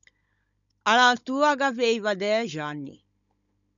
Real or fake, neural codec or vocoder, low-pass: fake; codec, 16 kHz, 16 kbps, FunCodec, trained on Chinese and English, 50 frames a second; 7.2 kHz